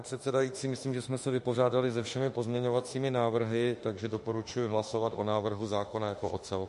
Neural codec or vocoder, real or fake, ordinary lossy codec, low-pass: autoencoder, 48 kHz, 32 numbers a frame, DAC-VAE, trained on Japanese speech; fake; MP3, 48 kbps; 14.4 kHz